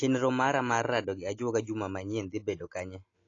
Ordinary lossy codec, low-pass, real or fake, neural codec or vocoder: AAC, 32 kbps; 7.2 kHz; real; none